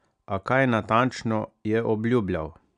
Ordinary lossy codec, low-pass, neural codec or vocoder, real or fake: MP3, 96 kbps; 9.9 kHz; none; real